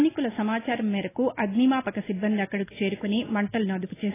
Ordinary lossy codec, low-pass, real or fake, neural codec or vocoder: AAC, 16 kbps; 3.6 kHz; real; none